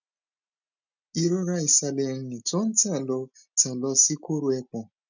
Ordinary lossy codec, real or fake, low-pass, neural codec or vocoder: none; real; 7.2 kHz; none